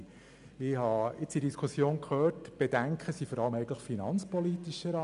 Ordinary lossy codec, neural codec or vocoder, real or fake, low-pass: none; none; real; 10.8 kHz